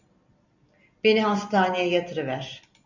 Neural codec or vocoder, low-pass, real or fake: none; 7.2 kHz; real